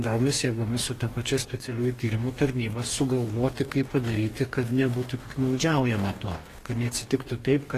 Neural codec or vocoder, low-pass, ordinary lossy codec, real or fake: codec, 44.1 kHz, 2.6 kbps, DAC; 14.4 kHz; AAC, 48 kbps; fake